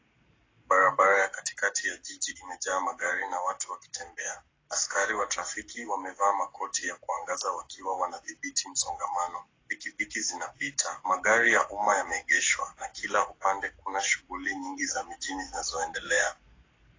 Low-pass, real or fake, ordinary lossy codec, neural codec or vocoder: 7.2 kHz; fake; AAC, 32 kbps; codec, 44.1 kHz, 7.8 kbps, Pupu-Codec